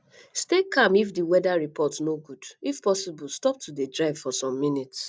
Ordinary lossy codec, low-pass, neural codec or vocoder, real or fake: none; none; none; real